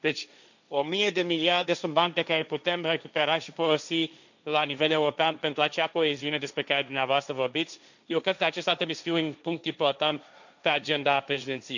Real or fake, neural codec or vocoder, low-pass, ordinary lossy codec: fake; codec, 16 kHz, 1.1 kbps, Voila-Tokenizer; 7.2 kHz; none